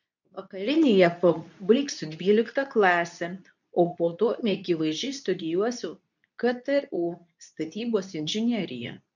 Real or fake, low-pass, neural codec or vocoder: fake; 7.2 kHz; codec, 24 kHz, 0.9 kbps, WavTokenizer, medium speech release version 2